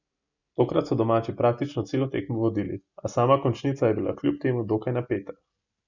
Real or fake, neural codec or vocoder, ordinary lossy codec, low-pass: real; none; none; 7.2 kHz